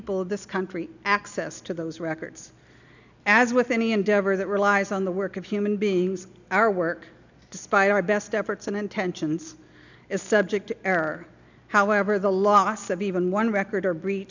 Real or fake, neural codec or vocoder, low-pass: real; none; 7.2 kHz